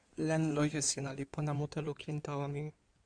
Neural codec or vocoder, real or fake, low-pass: codec, 16 kHz in and 24 kHz out, 2.2 kbps, FireRedTTS-2 codec; fake; 9.9 kHz